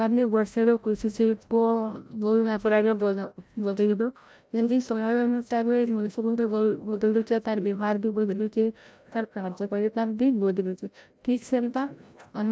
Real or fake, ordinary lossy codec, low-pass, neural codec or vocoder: fake; none; none; codec, 16 kHz, 0.5 kbps, FreqCodec, larger model